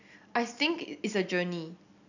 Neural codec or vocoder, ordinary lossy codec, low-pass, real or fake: none; none; 7.2 kHz; real